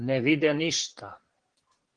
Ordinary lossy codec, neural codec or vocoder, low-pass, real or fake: Opus, 16 kbps; vocoder, 22.05 kHz, 80 mel bands, Vocos; 9.9 kHz; fake